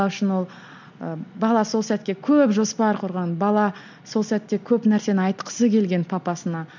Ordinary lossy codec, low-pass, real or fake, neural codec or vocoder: none; 7.2 kHz; real; none